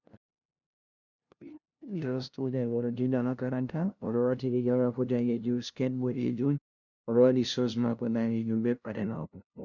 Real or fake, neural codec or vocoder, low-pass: fake; codec, 16 kHz, 0.5 kbps, FunCodec, trained on LibriTTS, 25 frames a second; 7.2 kHz